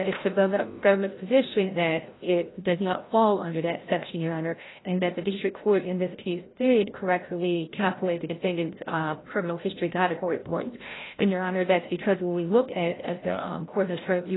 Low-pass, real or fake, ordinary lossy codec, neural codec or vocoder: 7.2 kHz; fake; AAC, 16 kbps; codec, 16 kHz, 0.5 kbps, FreqCodec, larger model